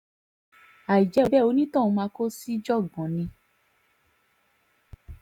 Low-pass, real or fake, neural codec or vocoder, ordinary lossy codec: 19.8 kHz; real; none; none